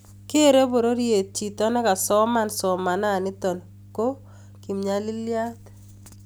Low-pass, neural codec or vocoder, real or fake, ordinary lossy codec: none; none; real; none